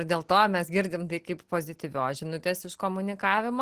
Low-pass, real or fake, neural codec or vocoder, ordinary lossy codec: 14.4 kHz; real; none; Opus, 16 kbps